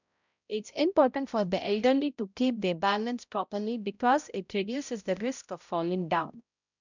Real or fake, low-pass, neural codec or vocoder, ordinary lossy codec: fake; 7.2 kHz; codec, 16 kHz, 0.5 kbps, X-Codec, HuBERT features, trained on balanced general audio; none